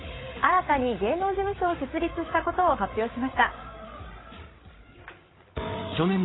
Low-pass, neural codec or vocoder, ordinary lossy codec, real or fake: 7.2 kHz; codec, 16 kHz, 8 kbps, FreqCodec, larger model; AAC, 16 kbps; fake